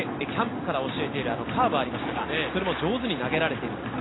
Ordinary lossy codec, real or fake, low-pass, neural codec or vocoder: AAC, 16 kbps; real; 7.2 kHz; none